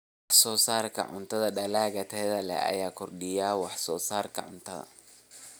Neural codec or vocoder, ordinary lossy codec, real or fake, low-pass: none; none; real; none